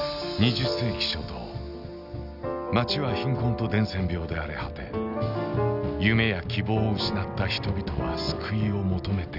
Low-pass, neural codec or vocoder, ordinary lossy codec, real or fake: 5.4 kHz; none; none; real